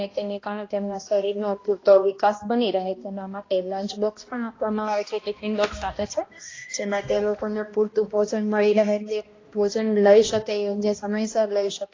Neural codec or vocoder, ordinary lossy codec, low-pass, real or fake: codec, 16 kHz, 1 kbps, X-Codec, HuBERT features, trained on balanced general audio; AAC, 32 kbps; 7.2 kHz; fake